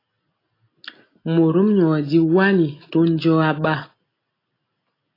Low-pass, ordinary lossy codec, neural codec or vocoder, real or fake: 5.4 kHz; AAC, 24 kbps; none; real